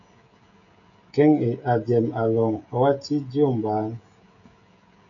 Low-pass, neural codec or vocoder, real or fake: 7.2 kHz; codec, 16 kHz, 16 kbps, FreqCodec, smaller model; fake